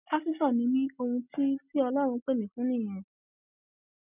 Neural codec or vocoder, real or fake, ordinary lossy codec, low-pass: none; real; none; 3.6 kHz